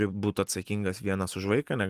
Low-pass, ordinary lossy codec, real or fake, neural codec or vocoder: 14.4 kHz; Opus, 24 kbps; real; none